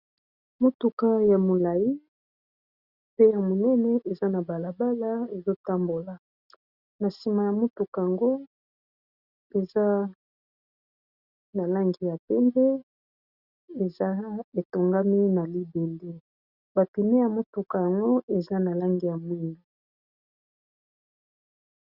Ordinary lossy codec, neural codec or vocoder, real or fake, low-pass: Opus, 64 kbps; none; real; 5.4 kHz